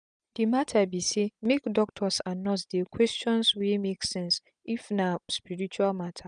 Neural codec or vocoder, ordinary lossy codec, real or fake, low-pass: none; none; real; 9.9 kHz